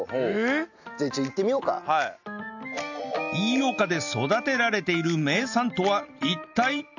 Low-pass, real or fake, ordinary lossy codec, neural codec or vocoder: 7.2 kHz; real; none; none